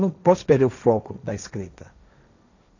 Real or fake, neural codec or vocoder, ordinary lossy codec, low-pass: fake; codec, 16 kHz, 1.1 kbps, Voila-Tokenizer; none; 7.2 kHz